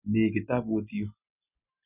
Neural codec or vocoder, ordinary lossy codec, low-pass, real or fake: none; none; 3.6 kHz; real